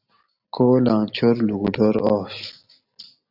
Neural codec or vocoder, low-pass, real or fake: none; 5.4 kHz; real